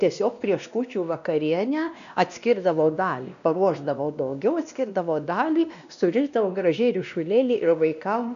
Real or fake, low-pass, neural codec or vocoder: fake; 7.2 kHz; codec, 16 kHz, 1 kbps, X-Codec, WavLM features, trained on Multilingual LibriSpeech